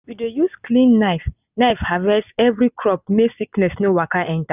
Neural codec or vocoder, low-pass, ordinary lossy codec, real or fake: none; 3.6 kHz; none; real